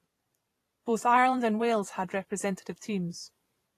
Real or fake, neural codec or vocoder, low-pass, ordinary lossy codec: fake; vocoder, 44.1 kHz, 128 mel bands every 512 samples, BigVGAN v2; 14.4 kHz; AAC, 48 kbps